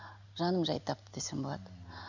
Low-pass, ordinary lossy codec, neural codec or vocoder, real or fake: 7.2 kHz; none; none; real